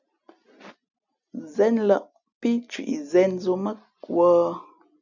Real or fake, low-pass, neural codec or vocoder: real; 7.2 kHz; none